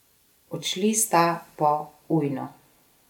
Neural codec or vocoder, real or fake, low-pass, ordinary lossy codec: none; real; 19.8 kHz; none